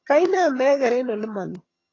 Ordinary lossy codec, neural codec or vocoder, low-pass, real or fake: AAC, 32 kbps; vocoder, 22.05 kHz, 80 mel bands, HiFi-GAN; 7.2 kHz; fake